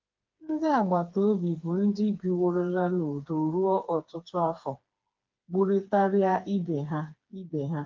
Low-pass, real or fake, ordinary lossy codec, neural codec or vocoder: 7.2 kHz; fake; Opus, 32 kbps; codec, 16 kHz, 4 kbps, FreqCodec, smaller model